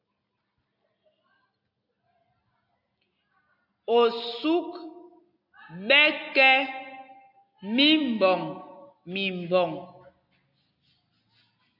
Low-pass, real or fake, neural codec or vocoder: 5.4 kHz; real; none